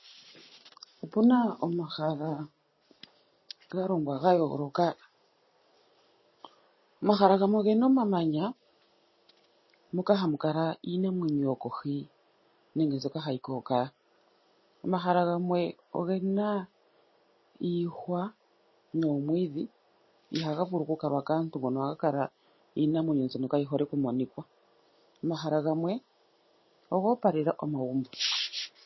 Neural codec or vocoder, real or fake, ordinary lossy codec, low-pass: none; real; MP3, 24 kbps; 7.2 kHz